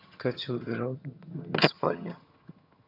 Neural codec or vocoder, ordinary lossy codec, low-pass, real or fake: vocoder, 22.05 kHz, 80 mel bands, HiFi-GAN; none; 5.4 kHz; fake